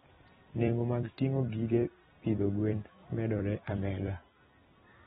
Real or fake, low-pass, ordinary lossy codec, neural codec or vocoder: fake; 19.8 kHz; AAC, 16 kbps; vocoder, 44.1 kHz, 128 mel bands every 256 samples, BigVGAN v2